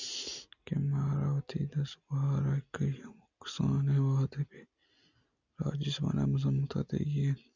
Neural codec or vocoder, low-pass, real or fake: none; 7.2 kHz; real